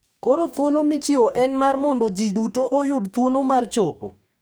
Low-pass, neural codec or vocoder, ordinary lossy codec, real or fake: none; codec, 44.1 kHz, 2.6 kbps, DAC; none; fake